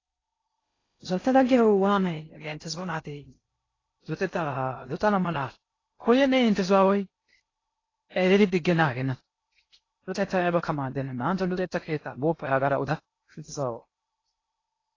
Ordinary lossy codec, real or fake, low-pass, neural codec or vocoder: AAC, 32 kbps; fake; 7.2 kHz; codec, 16 kHz in and 24 kHz out, 0.6 kbps, FocalCodec, streaming, 4096 codes